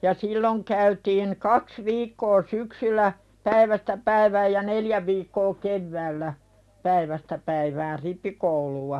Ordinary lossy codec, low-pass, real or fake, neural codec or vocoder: none; none; real; none